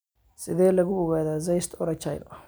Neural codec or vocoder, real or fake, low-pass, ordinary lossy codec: none; real; none; none